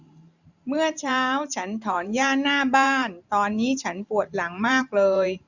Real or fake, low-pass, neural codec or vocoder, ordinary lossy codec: fake; 7.2 kHz; vocoder, 44.1 kHz, 128 mel bands every 512 samples, BigVGAN v2; none